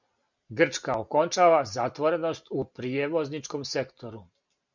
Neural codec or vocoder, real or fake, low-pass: none; real; 7.2 kHz